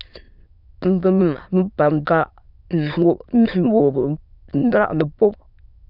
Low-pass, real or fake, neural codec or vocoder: 5.4 kHz; fake; autoencoder, 22.05 kHz, a latent of 192 numbers a frame, VITS, trained on many speakers